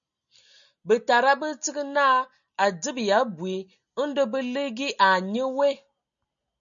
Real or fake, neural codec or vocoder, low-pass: real; none; 7.2 kHz